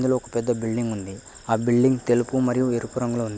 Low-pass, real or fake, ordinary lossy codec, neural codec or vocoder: none; real; none; none